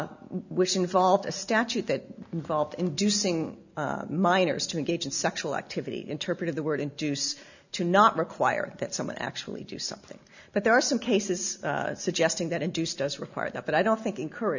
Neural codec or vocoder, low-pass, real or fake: none; 7.2 kHz; real